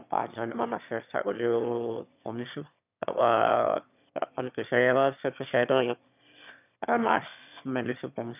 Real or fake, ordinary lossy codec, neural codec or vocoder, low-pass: fake; none; autoencoder, 22.05 kHz, a latent of 192 numbers a frame, VITS, trained on one speaker; 3.6 kHz